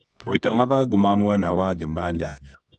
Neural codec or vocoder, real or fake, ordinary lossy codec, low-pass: codec, 24 kHz, 0.9 kbps, WavTokenizer, medium music audio release; fake; none; 10.8 kHz